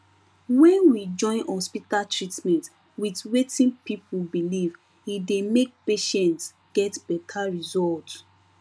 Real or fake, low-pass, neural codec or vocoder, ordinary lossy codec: real; none; none; none